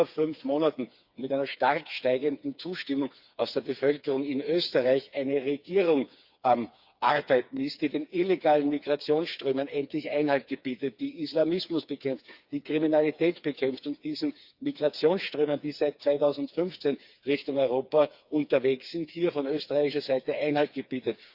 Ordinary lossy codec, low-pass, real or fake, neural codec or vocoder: Opus, 64 kbps; 5.4 kHz; fake; codec, 16 kHz, 4 kbps, FreqCodec, smaller model